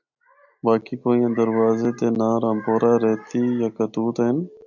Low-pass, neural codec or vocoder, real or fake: 7.2 kHz; none; real